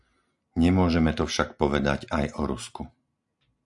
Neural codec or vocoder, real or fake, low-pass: none; real; 10.8 kHz